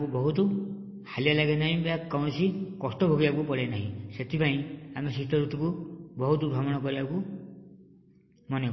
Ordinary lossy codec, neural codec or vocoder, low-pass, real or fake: MP3, 24 kbps; none; 7.2 kHz; real